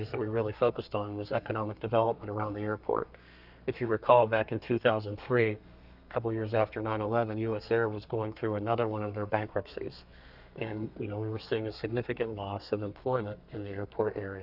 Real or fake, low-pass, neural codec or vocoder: fake; 5.4 kHz; codec, 32 kHz, 1.9 kbps, SNAC